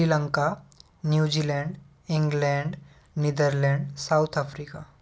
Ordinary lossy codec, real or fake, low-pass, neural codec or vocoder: none; real; none; none